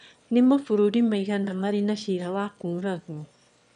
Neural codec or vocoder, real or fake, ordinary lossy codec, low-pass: autoencoder, 22.05 kHz, a latent of 192 numbers a frame, VITS, trained on one speaker; fake; none; 9.9 kHz